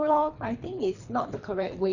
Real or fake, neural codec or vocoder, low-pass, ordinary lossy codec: fake; codec, 24 kHz, 3 kbps, HILCodec; 7.2 kHz; none